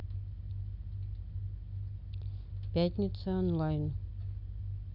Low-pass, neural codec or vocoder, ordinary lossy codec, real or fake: 5.4 kHz; none; Opus, 64 kbps; real